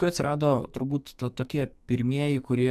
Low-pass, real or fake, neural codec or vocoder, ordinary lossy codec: 14.4 kHz; fake; codec, 44.1 kHz, 2.6 kbps, SNAC; AAC, 96 kbps